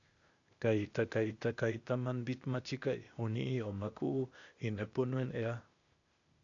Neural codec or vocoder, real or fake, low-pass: codec, 16 kHz, 0.8 kbps, ZipCodec; fake; 7.2 kHz